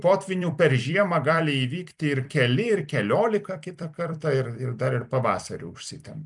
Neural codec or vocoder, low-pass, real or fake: vocoder, 44.1 kHz, 128 mel bands every 256 samples, BigVGAN v2; 10.8 kHz; fake